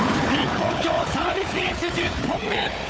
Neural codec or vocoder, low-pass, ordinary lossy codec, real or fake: codec, 16 kHz, 4 kbps, FunCodec, trained on Chinese and English, 50 frames a second; none; none; fake